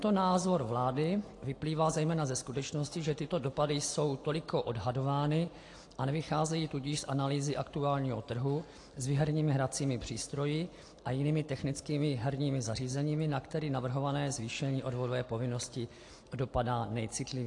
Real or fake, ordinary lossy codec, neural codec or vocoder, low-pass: real; AAC, 48 kbps; none; 10.8 kHz